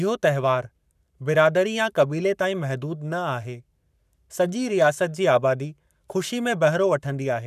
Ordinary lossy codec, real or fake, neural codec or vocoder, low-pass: none; fake; codec, 44.1 kHz, 7.8 kbps, Pupu-Codec; 14.4 kHz